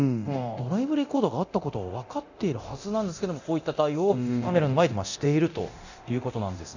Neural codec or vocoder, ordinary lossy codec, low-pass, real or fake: codec, 24 kHz, 0.9 kbps, DualCodec; none; 7.2 kHz; fake